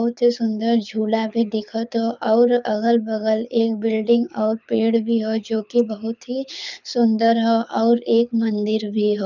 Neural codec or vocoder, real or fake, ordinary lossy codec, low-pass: codec, 24 kHz, 6 kbps, HILCodec; fake; none; 7.2 kHz